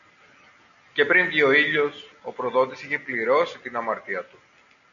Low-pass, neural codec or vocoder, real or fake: 7.2 kHz; none; real